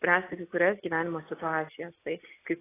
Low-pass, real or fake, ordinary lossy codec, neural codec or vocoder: 3.6 kHz; real; AAC, 16 kbps; none